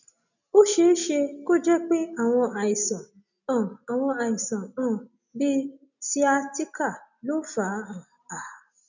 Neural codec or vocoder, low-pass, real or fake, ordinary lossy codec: none; 7.2 kHz; real; none